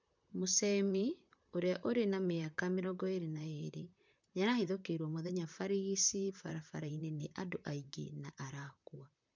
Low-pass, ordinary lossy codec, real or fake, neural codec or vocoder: 7.2 kHz; MP3, 64 kbps; fake; vocoder, 44.1 kHz, 128 mel bands, Pupu-Vocoder